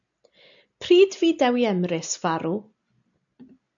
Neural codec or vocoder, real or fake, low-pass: none; real; 7.2 kHz